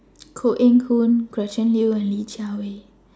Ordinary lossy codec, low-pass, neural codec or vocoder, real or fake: none; none; none; real